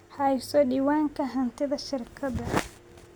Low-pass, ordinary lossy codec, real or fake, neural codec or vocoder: none; none; real; none